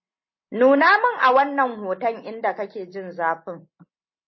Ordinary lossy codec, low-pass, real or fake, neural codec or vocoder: MP3, 24 kbps; 7.2 kHz; real; none